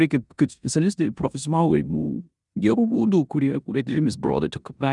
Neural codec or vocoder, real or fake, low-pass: codec, 16 kHz in and 24 kHz out, 0.9 kbps, LongCat-Audio-Codec, four codebook decoder; fake; 10.8 kHz